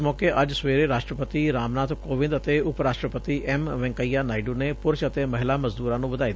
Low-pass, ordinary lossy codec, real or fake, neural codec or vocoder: none; none; real; none